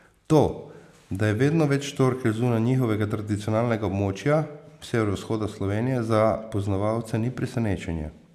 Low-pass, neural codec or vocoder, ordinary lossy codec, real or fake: 14.4 kHz; none; none; real